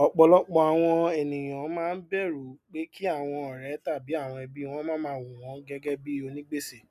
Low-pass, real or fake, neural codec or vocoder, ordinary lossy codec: 14.4 kHz; real; none; none